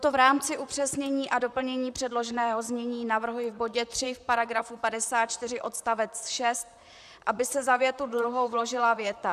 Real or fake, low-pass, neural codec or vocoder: fake; 14.4 kHz; vocoder, 44.1 kHz, 128 mel bands, Pupu-Vocoder